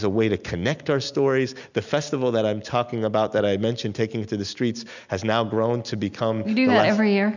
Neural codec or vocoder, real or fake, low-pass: none; real; 7.2 kHz